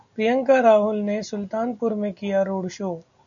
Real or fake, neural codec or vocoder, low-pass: real; none; 7.2 kHz